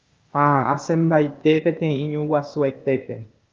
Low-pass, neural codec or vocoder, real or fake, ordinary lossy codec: 7.2 kHz; codec, 16 kHz, 0.8 kbps, ZipCodec; fake; Opus, 24 kbps